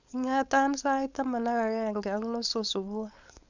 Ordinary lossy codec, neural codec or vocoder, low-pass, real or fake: none; codec, 16 kHz, 4.8 kbps, FACodec; 7.2 kHz; fake